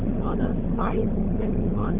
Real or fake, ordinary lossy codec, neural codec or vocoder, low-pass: fake; Opus, 24 kbps; codec, 16 kHz, 16 kbps, FunCodec, trained on LibriTTS, 50 frames a second; 3.6 kHz